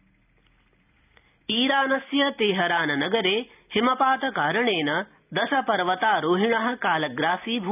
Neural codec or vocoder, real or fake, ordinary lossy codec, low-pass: none; real; none; 3.6 kHz